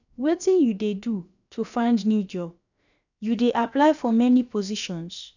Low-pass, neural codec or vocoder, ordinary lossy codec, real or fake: 7.2 kHz; codec, 16 kHz, about 1 kbps, DyCAST, with the encoder's durations; none; fake